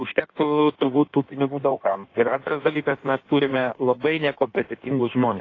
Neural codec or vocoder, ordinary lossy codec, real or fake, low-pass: codec, 16 kHz in and 24 kHz out, 1.1 kbps, FireRedTTS-2 codec; AAC, 32 kbps; fake; 7.2 kHz